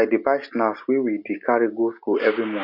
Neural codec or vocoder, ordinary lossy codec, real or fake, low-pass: none; none; real; 5.4 kHz